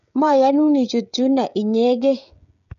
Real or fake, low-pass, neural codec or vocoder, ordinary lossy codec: fake; 7.2 kHz; codec, 16 kHz, 16 kbps, FreqCodec, smaller model; none